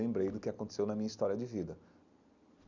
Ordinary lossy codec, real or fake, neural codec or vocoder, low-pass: none; real; none; 7.2 kHz